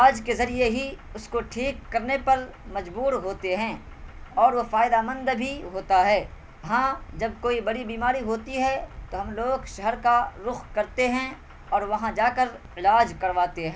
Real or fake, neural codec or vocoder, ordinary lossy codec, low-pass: real; none; none; none